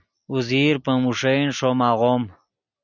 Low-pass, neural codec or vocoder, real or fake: 7.2 kHz; none; real